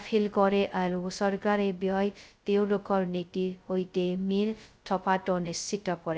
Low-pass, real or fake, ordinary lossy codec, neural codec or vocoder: none; fake; none; codec, 16 kHz, 0.2 kbps, FocalCodec